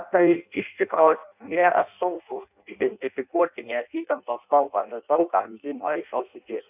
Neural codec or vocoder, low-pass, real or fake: codec, 16 kHz in and 24 kHz out, 0.6 kbps, FireRedTTS-2 codec; 3.6 kHz; fake